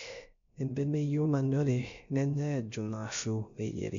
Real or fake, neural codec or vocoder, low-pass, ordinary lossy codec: fake; codec, 16 kHz, 0.3 kbps, FocalCodec; 7.2 kHz; MP3, 64 kbps